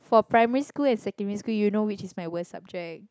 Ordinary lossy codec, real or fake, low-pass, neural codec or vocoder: none; real; none; none